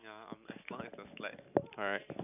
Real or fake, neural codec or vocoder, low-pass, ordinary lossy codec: real; none; 3.6 kHz; none